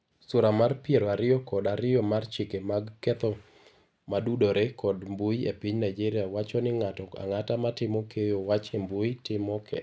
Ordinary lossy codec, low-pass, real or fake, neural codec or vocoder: none; none; real; none